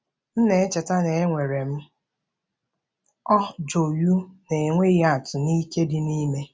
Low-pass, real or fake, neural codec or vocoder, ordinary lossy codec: none; real; none; none